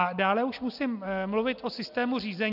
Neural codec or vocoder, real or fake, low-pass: none; real; 5.4 kHz